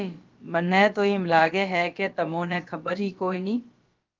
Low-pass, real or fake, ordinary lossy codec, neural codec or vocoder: 7.2 kHz; fake; Opus, 32 kbps; codec, 16 kHz, about 1 kbps, DyCAST, with the encoder's durations